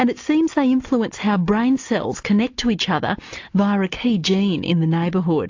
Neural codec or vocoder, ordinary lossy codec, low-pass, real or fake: none; AAC, 48 kbps; 7.2 kHz; real